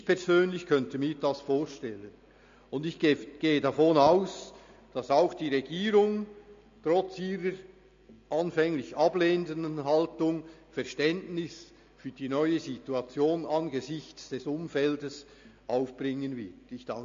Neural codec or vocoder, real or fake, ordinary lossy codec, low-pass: none; real; MP3, 48 kbps; 7.2 kHz